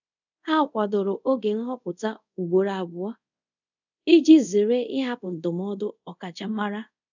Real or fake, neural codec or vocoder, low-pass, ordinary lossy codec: fake; codec, 24 kHz, 0.5 kbps, DualCodec; 7.2 kHz; none